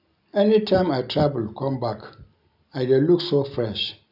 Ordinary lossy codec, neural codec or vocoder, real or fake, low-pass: none; none; real; 5.4 kHz